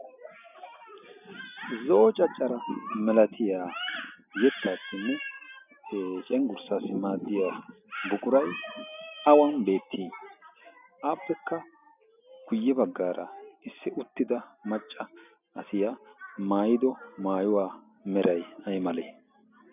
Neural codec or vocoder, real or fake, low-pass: none; real; 3.6 kHz